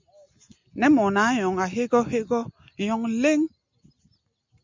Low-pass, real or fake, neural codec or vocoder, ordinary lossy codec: 7.2 kHz; real; none; MP3, 64 kbps